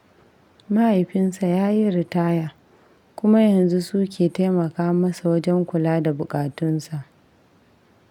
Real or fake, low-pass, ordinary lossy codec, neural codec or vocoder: real; 19.8 kHz; none; none